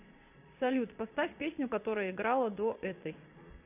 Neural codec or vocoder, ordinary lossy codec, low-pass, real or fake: vocoder, 22.05 kHz, 80 mel bands, Vocos; AAC, 32 kbps; 3.6 kHz; fake